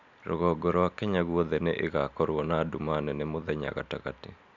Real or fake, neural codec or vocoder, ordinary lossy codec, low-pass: real; none; none; 7.2 kHz